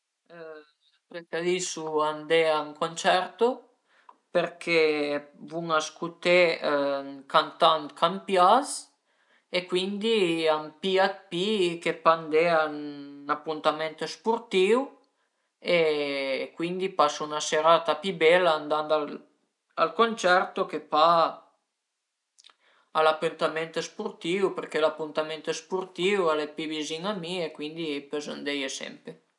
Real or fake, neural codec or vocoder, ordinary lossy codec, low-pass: real; none; none; 10.8 kHz